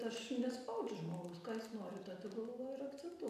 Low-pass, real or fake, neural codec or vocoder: 14.4 kHz; real; none